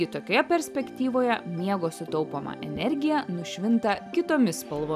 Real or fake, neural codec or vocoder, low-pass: real; none; 14.4 kHz